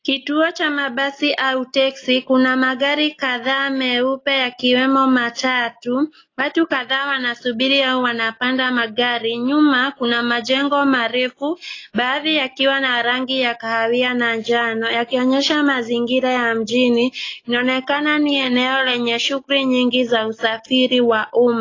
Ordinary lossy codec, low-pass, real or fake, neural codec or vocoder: AAC, 32 kbps; 7.2 kHz; real; none